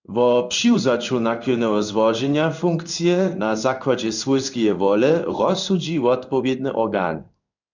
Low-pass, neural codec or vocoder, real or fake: 7.2 kHz; codec, 16 kHz in and 24 kHz out, 1 kbps, XY-Tokenizer; fake